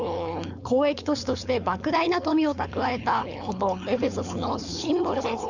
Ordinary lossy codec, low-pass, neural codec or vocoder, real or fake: none; 7.2 kHz; codec, 16 kHz, 4.8 kbps, FACodec; fake